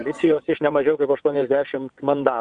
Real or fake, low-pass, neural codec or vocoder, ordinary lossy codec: fake; 9.9 kHz; vocoder, 22.05 kHz, 80 mel bands, WaveNeXt; Opus, 64 kbps